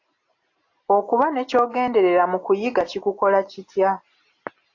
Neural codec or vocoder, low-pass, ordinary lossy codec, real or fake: none; 7.2 kHz; AAC, 48 kbps; real